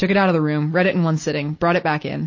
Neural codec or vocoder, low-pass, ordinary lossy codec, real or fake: none; 7.2 kHz; MP3, 32 kbps; real